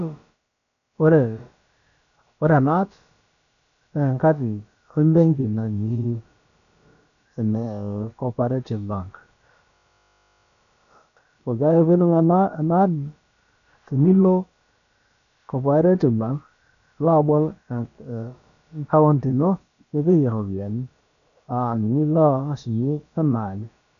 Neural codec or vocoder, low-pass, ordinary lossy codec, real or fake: codec, 16 kHz, about 1 kbps, DyCAST, with the encoder's durations; 7.2 kHz; none; fake